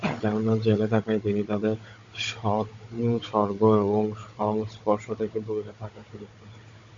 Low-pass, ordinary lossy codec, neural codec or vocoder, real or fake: 7.2 kHz; MP3, 48 kbps; codec, 16 kHz, 16 kbps, FunCodec, trained on Chinese and English, 50 frames a second; fake